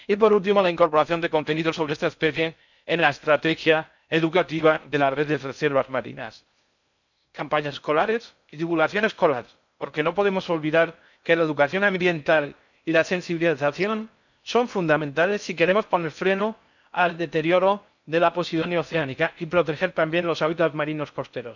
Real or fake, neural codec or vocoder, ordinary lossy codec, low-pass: fake; codec, 16 kHz in and 24 kHz out, 0.6 kbps, FocalCodec, streaming, 4096 codes; none; 7.2 kHz